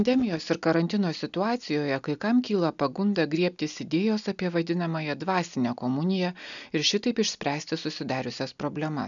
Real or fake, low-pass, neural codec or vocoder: real; 7.2 kHz; none